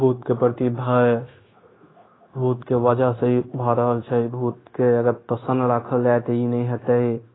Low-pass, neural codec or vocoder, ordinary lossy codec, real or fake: 7.2 kHz; codec, 16 kHz, 0.9 kbps, LongCat-Audio-Codec; AAC, 16 kbps; fake